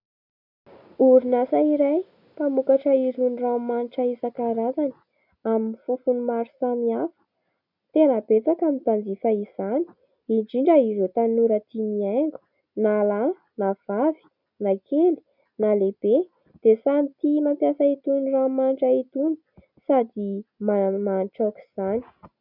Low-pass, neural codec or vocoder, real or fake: 5.4 kHz; none; real